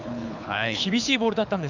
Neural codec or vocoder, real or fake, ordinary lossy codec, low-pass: codec, 24 kHz, 6 kbps, HILCodec; fake; none; 7.2 kHz